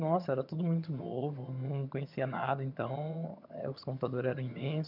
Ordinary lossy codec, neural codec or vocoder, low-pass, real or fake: none; vocoder, 22.05 kHz, 80 mel bands, HiFi-GAN; 5.4 kHz; fake